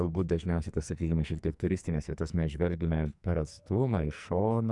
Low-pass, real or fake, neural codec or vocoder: 10.8 kHz; fake; codec, 44.1 kHz, 2.6 kbps, SNAC